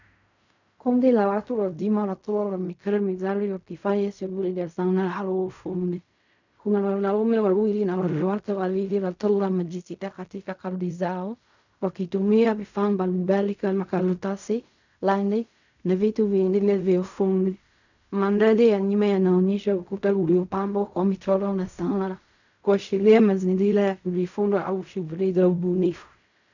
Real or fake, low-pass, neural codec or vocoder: fake; 7.2 kHz; codec, 16 kHz in and 24 kHz out, 0.4 kbps, LongCat-Audio-Codec, fine tuned four codebook decoder